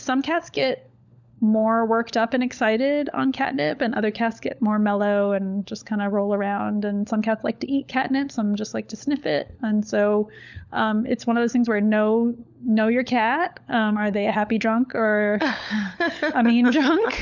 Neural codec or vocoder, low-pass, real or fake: codec, 16 kHz, 16 kbps, FunCodec, trained on LibriTTS, 50 frames a second; 7.2 kHz; fake